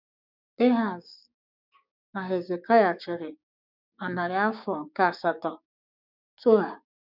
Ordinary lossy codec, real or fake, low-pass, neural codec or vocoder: none; fake; 5.4 kHz; codec, 16 kHz, 6 kbps, DAC